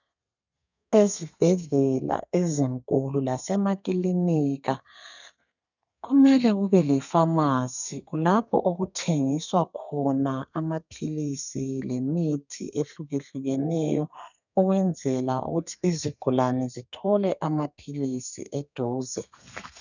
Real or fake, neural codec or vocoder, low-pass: fake; codec, 32 kHz, 1.9 kbps, SNAC; 7.2 kHz